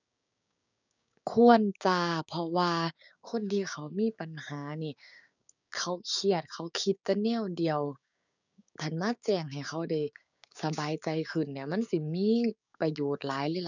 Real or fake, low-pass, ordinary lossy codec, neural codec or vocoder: fake; 7.2 kHz; none; codec, 16 kHz, 6 kbps, DAC